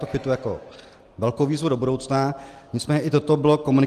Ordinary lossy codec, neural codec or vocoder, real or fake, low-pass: Opus, 24 kbps; none; real; 14.4 kHz